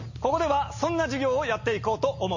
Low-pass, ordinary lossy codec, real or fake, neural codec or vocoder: 7.2 kHz; MP3, 32 kbps; real; none